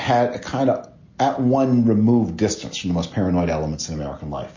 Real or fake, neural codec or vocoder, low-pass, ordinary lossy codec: real; none; 7.2 kHz; MP3, 32 kbps